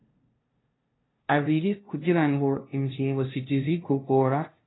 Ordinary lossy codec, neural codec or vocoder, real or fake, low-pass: AAC, 16 kbps; codec, 16 kHz, 0.5 kbps, FunCodec, trained on LibriTTS, 25 frames a second; fake; 7.2 kHz